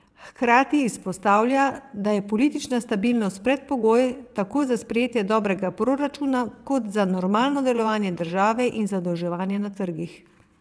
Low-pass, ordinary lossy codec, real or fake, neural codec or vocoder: none; none; fake; vocoder, 22.05 kHz, 80 mel bands, WaveNeXt